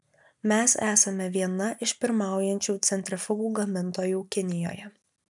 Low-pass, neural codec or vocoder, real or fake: 10.8 kHz; none; real